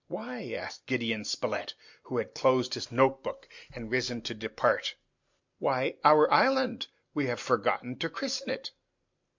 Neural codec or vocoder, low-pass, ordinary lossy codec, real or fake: none; 7.2 kHz; MP3, 64 kbps; real